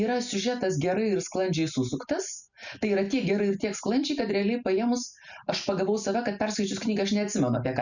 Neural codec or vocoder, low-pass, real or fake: none; 7.2 kHz; real